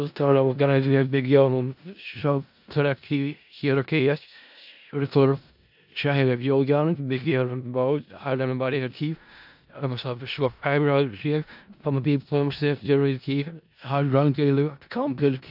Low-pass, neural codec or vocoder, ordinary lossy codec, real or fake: 5.4 kHz; codec, 16 kHz in and 24 kHz out, 0.4 kbps, LongCat-Audio-Codec, four codebook decoder; AAC, 48 kbps; fake